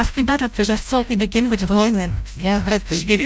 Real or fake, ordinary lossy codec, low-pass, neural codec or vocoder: fake; none; none; codec, 16 kHz, 0.5 kbps, FreqCodec, larger model